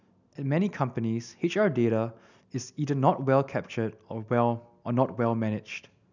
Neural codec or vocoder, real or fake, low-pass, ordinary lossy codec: none; real; 7.2 kHz; none